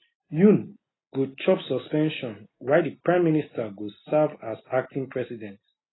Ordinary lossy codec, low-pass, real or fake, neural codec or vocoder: AAC, 16 kbps; 7.2 kHz; real; none